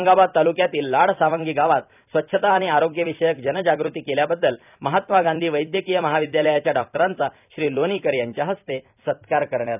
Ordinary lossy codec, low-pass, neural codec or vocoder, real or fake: none; 3.6 kHz; vocoder, 44.1 kHz, 128 mel bands every 256 samples, BigVGAN v2; fake